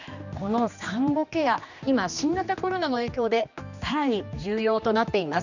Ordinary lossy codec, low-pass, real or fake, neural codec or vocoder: none; 7.2 kHz; fake; codec, 16 kHz, 2 kbps, X-Codec, HuBERT features, trained on general audio